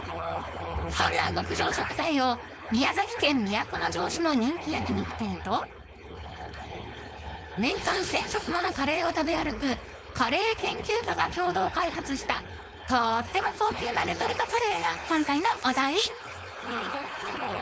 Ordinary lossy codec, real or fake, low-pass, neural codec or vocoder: none; fake; none; codec, 16 kHz, 4.8 kbps, FACodec